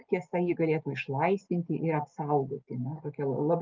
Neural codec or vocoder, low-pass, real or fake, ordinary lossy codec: none; 7.2 kHz; real; Opus, 32 kbps